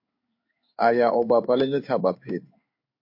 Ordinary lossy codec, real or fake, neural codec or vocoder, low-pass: MP3, 32 kbps; fake; codec, 16 kHz in and 24 kHz out, 1 kbps, XY-Tokenizer; 5.4 kHz